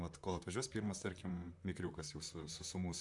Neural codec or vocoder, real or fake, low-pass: vocoder, 44.1 kHz, 128 mel bands, Pupu-Vocoder; fake; 10.8 kHz